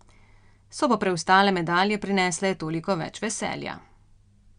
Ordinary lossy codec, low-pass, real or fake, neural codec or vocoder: Opus, 64 kbps; 9.9 kHz; real; none